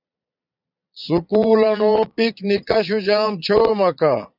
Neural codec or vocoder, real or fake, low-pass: vocoder, 22.05 kHz, 80 mel bands, Vocos; fake; 5.4 kHz